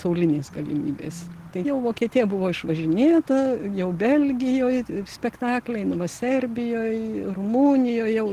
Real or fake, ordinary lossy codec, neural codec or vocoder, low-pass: real; Opus, 16 kbps; none; 14.4 kHz